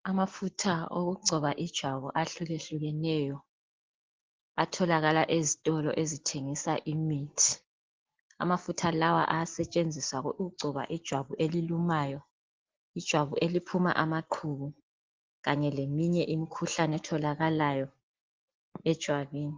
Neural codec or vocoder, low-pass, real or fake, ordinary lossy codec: none; 7.2 kHz; real; Opus, 16 kbps